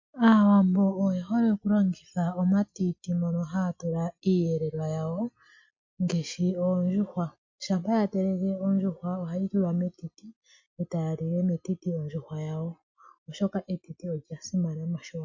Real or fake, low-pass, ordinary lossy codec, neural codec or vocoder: real; 7.2 kHz; MP3, 48 kbps; none